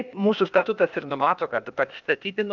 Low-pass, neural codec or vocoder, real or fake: 7.2 kHz; codec, 16 kHz, 0.8 kbps, ZipCodec; fake